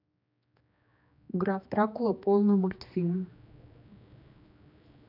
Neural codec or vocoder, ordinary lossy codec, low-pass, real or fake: codec, 16 kHz, 2 kbps, X-Codec, HuBERT features, trained on general audio; none; 5.4 kHz; fake